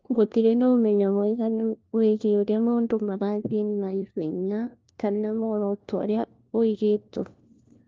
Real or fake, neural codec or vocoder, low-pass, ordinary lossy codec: fake; codec, 16 kHz, 1 kbps, FunCodec, trained on LibriTTS, 50 frames a second; 7.2 kHz; Opus, 24 kbps